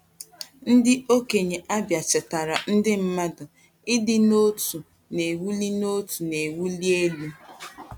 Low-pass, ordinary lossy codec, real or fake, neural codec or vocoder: 19.8 kHz; none; real; none